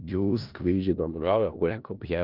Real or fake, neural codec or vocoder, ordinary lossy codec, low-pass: fake; codec, 16 kHz in and 24 kHz out, 0.4 kbps, LongCat-Audio-Codec, four codebook decoder; Opus, 32 kbps; 5.4 kHz